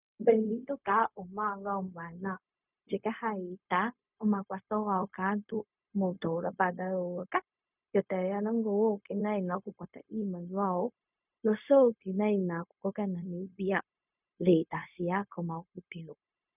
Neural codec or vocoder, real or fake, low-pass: codec, 16 kHz, 0.4 kbps, LongCat-Audio-Codec; fake; 3.6 kHz